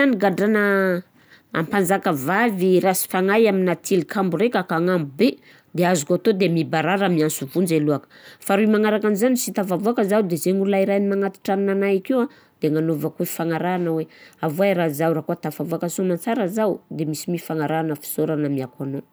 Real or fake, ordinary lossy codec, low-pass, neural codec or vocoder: real; none; none; none